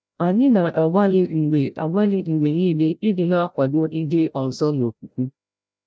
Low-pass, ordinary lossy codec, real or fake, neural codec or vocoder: none; none; fake; codec, 16 kHz, 0.5 kbps, FreqCodec, larger model